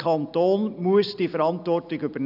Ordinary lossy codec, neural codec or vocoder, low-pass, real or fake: none; none; 5.4 kHz; real